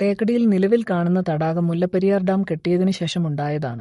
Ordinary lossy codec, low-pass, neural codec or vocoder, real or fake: MP3, 48 kbps; 19.8 kHz; codec, 44.1 kHz, 7.8 kbps, Pupu-Codec; fake